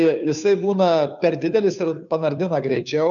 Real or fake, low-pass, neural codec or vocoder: fake; 7.2 kHz; codec, 16 kHz, 2 kbps, FunCodec, trained on Chinese and English, 25 frames a second